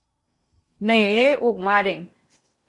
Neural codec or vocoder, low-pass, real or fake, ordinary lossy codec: codec, 16 kHz in and 24 kHz out, 0.6 kbps, FocalCodec, streaming, 2048 codes; 10.8 kHz; fake; MP3, 48 kbps